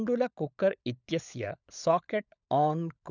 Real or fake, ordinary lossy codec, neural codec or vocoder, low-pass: fake; none; codec, 16 kHz, 16 kbps, FunCodec, trained on LibriTTS, 50 frames a second; 7.2 kHz